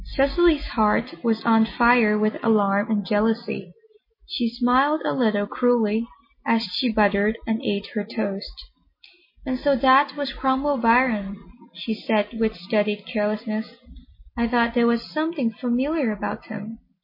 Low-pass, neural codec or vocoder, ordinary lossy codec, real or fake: 5.4 kHz; none; MP3, 24 kbps; real